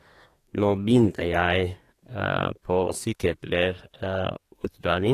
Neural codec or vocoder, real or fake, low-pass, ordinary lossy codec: codec, 32 kHz, 1.9 kbps, SNAC; fake; 14.4 kHz; AAC, 48 kbps